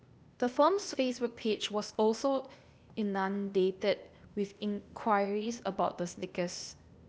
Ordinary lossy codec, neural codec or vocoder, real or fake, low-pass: none; codec, 16 kHz, 0.8 kbps, ZipCodec; fake; none